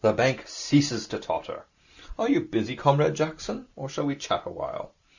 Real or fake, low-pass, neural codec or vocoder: real; 7.2 kHz; none